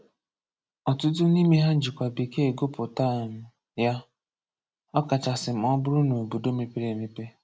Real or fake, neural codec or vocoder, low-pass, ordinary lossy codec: real; none; none; none